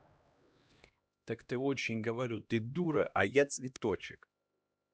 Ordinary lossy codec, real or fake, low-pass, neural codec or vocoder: none; fake; none; codec, 16 kHz, 1 kbps, X-Codec, HuBERT features, trained on LibriSpeech